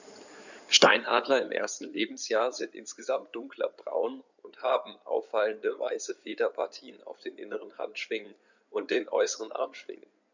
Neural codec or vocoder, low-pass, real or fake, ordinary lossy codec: codec, 16 kHz in and 24 kHz out, 2.2 kbps, FireRedTTS-2 codec; 7.2 kHz; fake; none